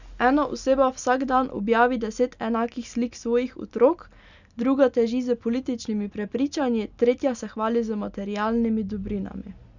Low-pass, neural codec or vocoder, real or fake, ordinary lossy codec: 7.2 kHz; none; real; none